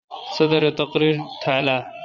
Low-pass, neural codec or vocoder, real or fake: 7.2 kHz; vocoder, 22.05 kHz, 80 mel bands, Vocos; fake